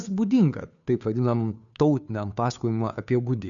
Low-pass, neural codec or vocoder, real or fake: 7.2 kHz; codec, 16 kHz, 2 kbps, FunCodec, trained on Chinese and English, 25 frames a second; fake